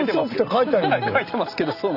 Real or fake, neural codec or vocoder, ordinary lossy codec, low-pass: real; none; none; 5.4 kHz